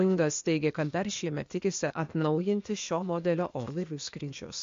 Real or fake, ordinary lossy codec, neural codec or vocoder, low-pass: fake; MP3, 48 kbps; codec, 16 kHz, 0.8 kbps, ZipCodec; 7.2 kHz